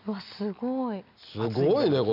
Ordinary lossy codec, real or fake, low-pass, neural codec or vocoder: none; real; 5.4 kHz; none